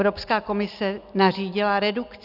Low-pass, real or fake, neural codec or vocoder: 5.4 kHz; real; none